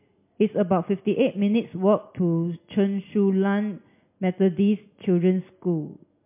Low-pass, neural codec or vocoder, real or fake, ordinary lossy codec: 3.6 kHz; none; real; MP3, 32 kbps